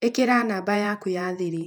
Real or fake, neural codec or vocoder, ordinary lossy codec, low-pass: fake; vocoder, 48 kHz, 128 mel bands, Vocos; none; 19.8 kHz